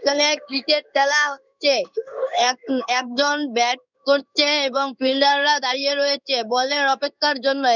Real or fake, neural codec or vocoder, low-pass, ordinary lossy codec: fake; codec, 16 kHz in and 24 kHz out, 1 kbps, XY-Tokenizer; 7.2 kHz; none